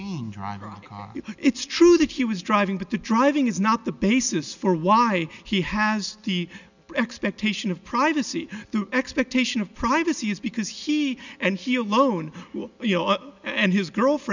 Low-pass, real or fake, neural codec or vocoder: 7.2 kHz; real; none